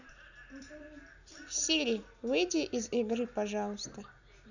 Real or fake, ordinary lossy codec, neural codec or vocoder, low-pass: real; none; none; 7.2 kHz